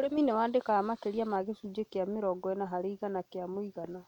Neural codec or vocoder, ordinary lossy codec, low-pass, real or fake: none; none; 19.8 kHz; real